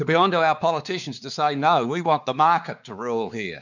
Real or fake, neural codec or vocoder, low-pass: fake; codec, 16 kHz, 4 kbps, X-Codec, WavLM features, trained on Multilingual LibriSpeech; 7.2 kHz